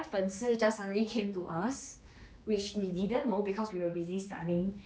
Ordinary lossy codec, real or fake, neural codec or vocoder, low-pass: none; fake; codec, 16 kHz, 2 kbps, X-Codec, HuBERT features, trained on general audio; none